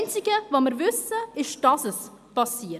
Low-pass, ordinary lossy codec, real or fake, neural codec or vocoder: 14.4 kHz; none; real; none